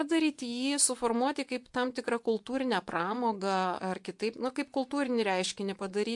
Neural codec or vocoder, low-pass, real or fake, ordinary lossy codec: vocoder, 24 kHz, 100 mel bands, Vocos; 10.8 kHz; fake; MP3, 64 kbps